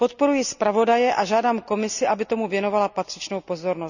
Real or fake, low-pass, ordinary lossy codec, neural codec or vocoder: real; 7.2 kHz; none; none